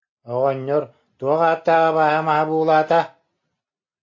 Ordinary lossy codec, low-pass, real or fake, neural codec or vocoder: AAC, 48 kbps; 7.2 kHz; real; none